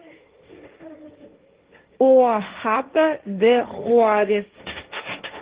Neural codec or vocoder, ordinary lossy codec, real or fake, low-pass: codec, 16 kHz, 1.1 kbps, Voila-Tokenizer; Opus, 16 kbps; fake; 3.6 kHz